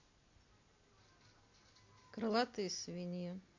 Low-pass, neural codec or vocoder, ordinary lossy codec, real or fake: 7.2 kHz; none; MP3, 48 kbps; real